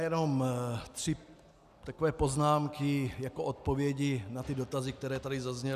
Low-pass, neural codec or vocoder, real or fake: 14.4 kHz; none; real